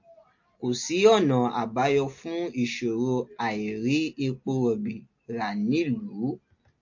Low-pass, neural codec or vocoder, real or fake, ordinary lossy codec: 7.2 kHz; none; real; MP3, 48 kbps